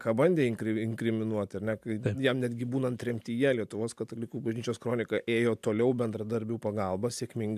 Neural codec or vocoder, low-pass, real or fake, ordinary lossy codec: none; 14.4 kHz; real; AAC, 96 kbps